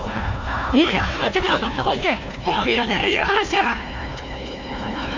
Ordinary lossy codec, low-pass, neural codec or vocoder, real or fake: MP3, 64 kbps; 7.2 kHz; codec, 16 kHz, 1 kbps, FunCodec, trained on Chinese and English, 50 frames a second; fake